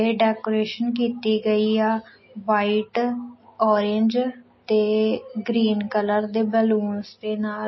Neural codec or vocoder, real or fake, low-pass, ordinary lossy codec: none; real; 7.2 kHz; MP3, 24 kbps